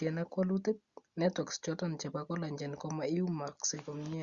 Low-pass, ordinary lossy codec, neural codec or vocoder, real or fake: 7.2 kHz; Opus, 64 kbps; none; real